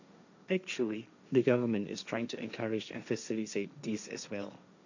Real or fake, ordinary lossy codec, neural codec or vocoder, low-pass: fake; none; codec, 16 kHz, 1.1 kbps, Voila-Tokenizer; 7.2 kHz